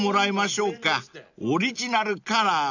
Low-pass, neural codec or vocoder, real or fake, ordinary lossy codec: 7.2 kHz; none; real; none